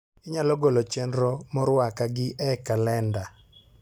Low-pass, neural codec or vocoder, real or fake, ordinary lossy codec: none; none; real; none